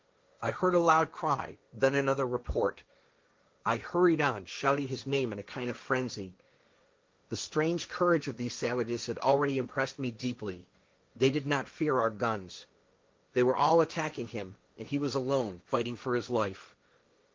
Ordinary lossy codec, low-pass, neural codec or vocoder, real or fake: Opus, 32 kbps; 7.2 kHz; codec, 16 kHz, 1.1 kbps, Voila-Tokenizer; fake